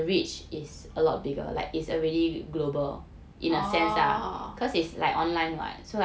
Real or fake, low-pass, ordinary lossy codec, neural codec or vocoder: real; none; none; none